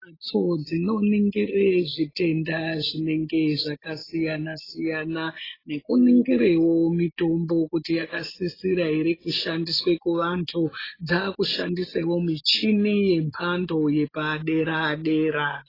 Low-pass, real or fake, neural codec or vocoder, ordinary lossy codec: 5.4 kHz; real; none; AAC, 24 kbps